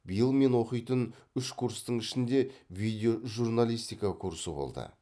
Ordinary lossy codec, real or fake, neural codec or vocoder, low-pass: none; real; none; none